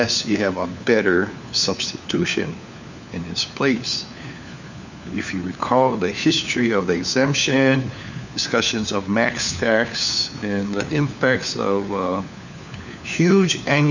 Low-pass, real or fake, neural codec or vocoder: 7.2 kHz; fake; codec, 16 kHz, 4 kbps, FunCodec, trained on LibriTTS, 50 frames a second